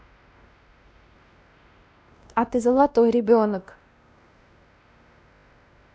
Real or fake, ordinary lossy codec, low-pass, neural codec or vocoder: fake; none; none; codec, 16 kHz, 1 kbps, X-Codec, WavLM features, trained on Multilingual LibriSpeech